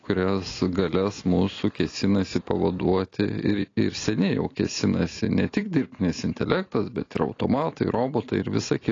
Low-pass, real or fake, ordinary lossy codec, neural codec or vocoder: 7.2 kHz; real; AAC, 32 kbps; none